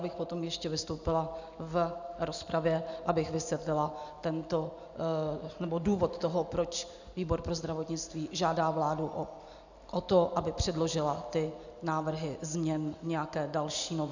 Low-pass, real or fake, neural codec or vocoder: 7.2 kHz; real; none